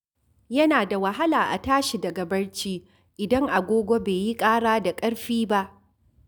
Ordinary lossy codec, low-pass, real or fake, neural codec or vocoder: none; none; real; none